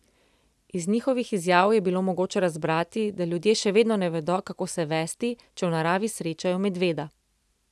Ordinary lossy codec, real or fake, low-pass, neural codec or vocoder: none; real; none; none